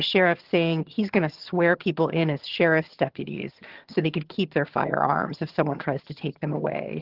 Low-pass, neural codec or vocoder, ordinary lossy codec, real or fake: 5.4 kHz; vocoder, 22.05 kHz, 80 mel bands, HiFi-GAN; Opus, 16 kbps; fake